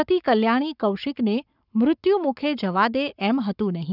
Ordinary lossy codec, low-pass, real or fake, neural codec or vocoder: none; 5.4 kHz; fake; codec, 44.1 kHz, 7.8 kbps, Pupu-Codec